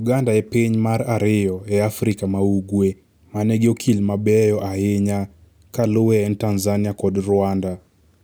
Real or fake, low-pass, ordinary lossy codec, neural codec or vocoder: real; none; none; none